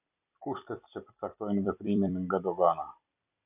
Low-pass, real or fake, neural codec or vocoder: 3.6 kHz; fake; vocoder, 44.1 kHz, 128 mel bands every 256 samples, BigVGAN v2